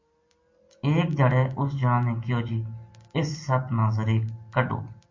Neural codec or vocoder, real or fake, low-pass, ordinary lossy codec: none; real; 7.2 kHz; MP3, 48 kbps